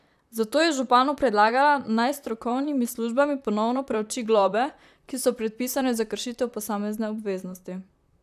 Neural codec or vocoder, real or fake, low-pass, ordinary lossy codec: vocoder, 44.1 kHz, 128 mel bands, Pupu-Vocoder; fake; 14.4 kHz; none